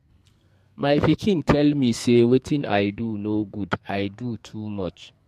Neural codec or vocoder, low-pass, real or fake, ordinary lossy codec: codec, 44.1 kHz, 2.6 kbps, SNAC; 14.4 kHz; fake; MP3, 64 kbps